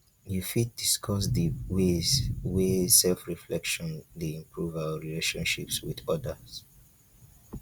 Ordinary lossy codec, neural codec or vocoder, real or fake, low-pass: Opus, 64 kbps; none; real; 19.8 kHz